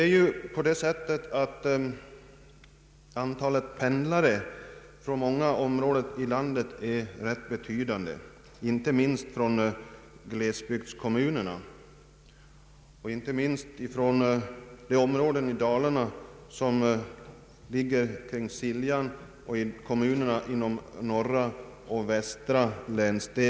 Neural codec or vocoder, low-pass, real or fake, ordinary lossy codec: none; none; real; none